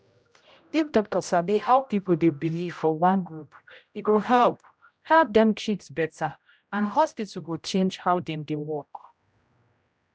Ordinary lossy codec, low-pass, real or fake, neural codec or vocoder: none; none; fake; codec, 16 kHz, 0.5 kbps, X-Codec, HuBERT features, trained on general audio